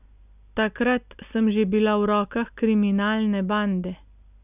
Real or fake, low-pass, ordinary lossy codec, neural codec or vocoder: real; 3.6 kHz; none; none